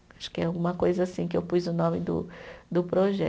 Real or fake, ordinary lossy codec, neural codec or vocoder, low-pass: real; none; none; none